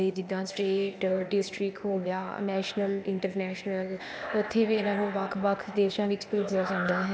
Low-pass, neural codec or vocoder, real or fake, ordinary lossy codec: none; codec, 16 kHz, 0.8 kbps, ZipCodec; fake; none